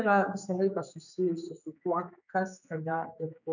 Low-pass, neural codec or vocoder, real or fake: 7.2 kHz; codec, 16 kHz, 4 kbps, X-Codec, HuBERT features, trained on general audio; fake